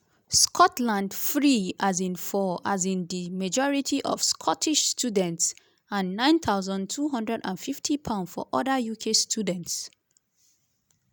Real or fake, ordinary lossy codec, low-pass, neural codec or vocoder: real; none; none; none